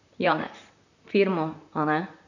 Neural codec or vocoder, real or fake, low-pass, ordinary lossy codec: vocoder, 44.1 kHz, 128 mel bands, Pupu-Vocoder; fake; 7.2 kHz; none